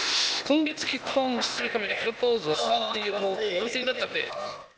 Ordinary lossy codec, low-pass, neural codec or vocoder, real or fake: none; none; codec, 16 kHz, 0.8 kbps, ZipCodec; fake